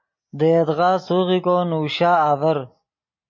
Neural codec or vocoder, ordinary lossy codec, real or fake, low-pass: none; MP3, 32 kbps; real; 7.2 kHz